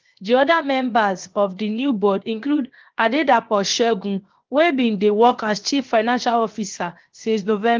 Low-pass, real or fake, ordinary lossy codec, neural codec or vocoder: 7.2 kHz; fake; Opus, 24 kbps; codec, 16 kHz, 0.7 kbps, FocalCodec